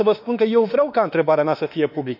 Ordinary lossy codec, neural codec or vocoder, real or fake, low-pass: none; autoencoder, 48 kHz, 32 numbers a frame, DAC-VAE, trained on Japanese speech; fake; 5.4 kHz